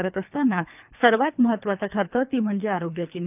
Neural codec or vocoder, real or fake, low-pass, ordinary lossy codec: codec, 24 kHz, 3 kbps, HILCodec; fake; 3.6 kHz; none